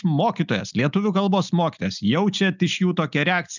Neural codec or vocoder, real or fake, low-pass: none; real; 7.2 kHz